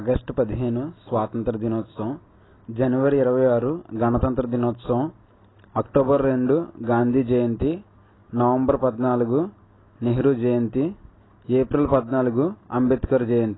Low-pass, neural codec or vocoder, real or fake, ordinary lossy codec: 7.2 kHz; none; real; AAC, 16 kbps